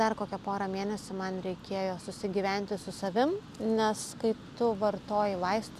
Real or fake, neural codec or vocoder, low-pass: real; none; 14.4 kHz